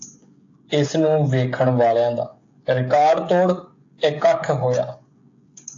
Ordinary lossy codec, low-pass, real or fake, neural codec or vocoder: AAC, 48 kbps; 7.2 kHz; fake; codec, 16 kHz, 16 kbps, FreqCodec, smaller model